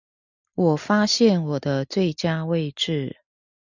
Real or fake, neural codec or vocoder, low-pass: real; none; 7.2 kHz